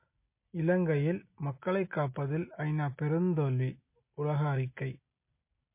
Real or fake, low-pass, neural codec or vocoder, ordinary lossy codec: real; 3.6 kHz; none; MP3, 24 kbps